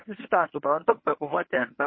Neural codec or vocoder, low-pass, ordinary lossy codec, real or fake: codec, 24 kHz, 0.9 kbps, WavTokenizer, medium speech release version 1; 7.2 kHz; MP3, 24 kbps; fake